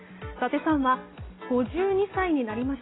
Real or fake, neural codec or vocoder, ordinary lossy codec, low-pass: real; none; AAC, 16 kbps; 7.2 kHz